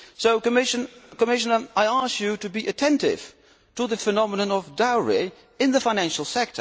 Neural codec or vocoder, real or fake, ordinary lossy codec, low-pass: none; real; none; none